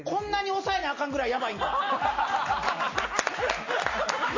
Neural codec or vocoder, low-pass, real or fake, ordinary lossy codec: none; 7.2 kHz; real; MP3, 32 kbps